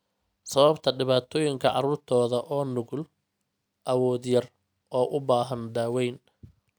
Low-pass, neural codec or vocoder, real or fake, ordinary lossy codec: none; none; real; none